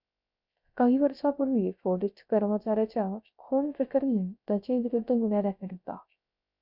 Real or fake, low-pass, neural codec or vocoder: fake; 5.4 kHz; codec, 16 kHz, 0.3 kbps, FocalCodec